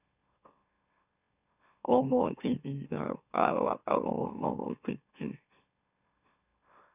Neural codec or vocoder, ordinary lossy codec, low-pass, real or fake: autoencoder, 44.1 kHz, a latent of 192 numbers a frame, MeloTTS; none; 3.6 kHz; fake